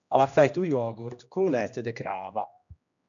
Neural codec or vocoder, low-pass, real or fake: codec, 16 kHz, 1 kbps, X-Codec, HuBERT features, trained on general audio; 7.2 kHz; fake